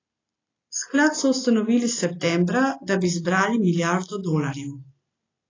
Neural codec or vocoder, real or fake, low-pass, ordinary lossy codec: none; real; 7.2 kHz; AAC, 32 kbps